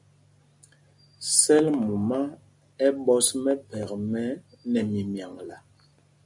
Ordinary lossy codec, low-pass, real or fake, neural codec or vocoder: MP3, 64 kbps; 10.8 kHz; real; none